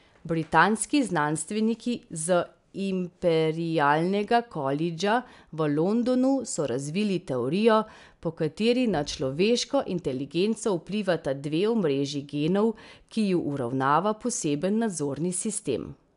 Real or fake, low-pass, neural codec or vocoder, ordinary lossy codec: real; 10.8 kHz; none; none